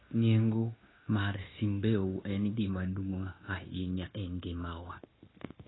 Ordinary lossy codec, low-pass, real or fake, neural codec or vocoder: AAC, 16 kbps; 7.2 kHz; fake; codec, 16 kHz, 0.9 kbps, LongCat-Audio-Codec